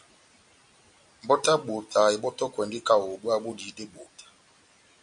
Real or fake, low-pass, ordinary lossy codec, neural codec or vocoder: real; 9.9 kHz; MP3, 96 kbps; none